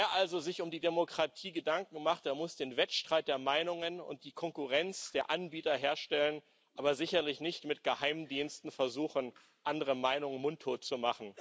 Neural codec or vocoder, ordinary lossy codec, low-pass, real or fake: none; none; none; real